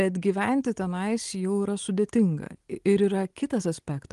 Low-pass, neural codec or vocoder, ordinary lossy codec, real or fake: 10.8 kHz; none; Opus, 24 kbps; real